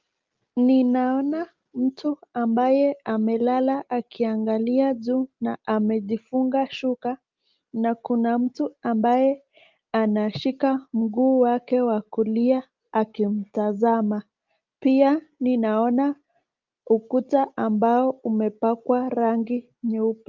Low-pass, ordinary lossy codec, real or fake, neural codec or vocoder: 7.2 kHz; Opus, 32 kbps; real; none